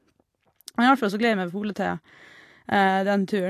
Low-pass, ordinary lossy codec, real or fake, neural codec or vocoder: 14.4 kHz; AAC, 64 kbps; real; none